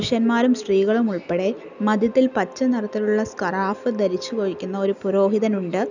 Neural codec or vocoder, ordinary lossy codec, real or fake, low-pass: none; none; real; 7.2 kHz